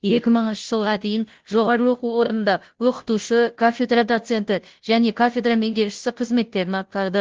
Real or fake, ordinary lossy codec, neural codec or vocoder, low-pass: fake; Opus, 16 kbps; codec, 16 kHz, 0.5 kbps, FunCodec, trained on Chinese and English, 25 frames a second; 7.2 kHz